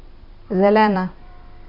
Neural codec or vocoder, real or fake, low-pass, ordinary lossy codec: vocoder, 44.1 kHz, 128 mel bands every 256 samples, BigVGAN v2; fake; 5.4 kHz; none